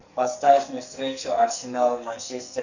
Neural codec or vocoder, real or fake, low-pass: codec, 44.1 kHz, 2.6 kbps, SNAC; fake; 7.2 kHz